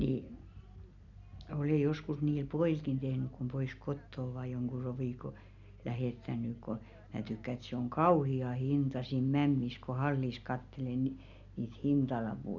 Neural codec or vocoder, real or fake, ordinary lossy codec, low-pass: none; real; none; 7.2 kHz